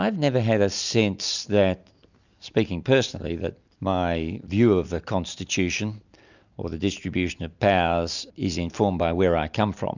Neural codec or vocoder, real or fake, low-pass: none; real; 7.2 kHz